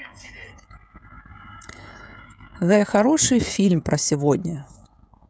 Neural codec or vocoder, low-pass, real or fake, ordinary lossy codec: codec, 16 kHz, 16 kbps, FreqCodec, smaller model; none; fake; none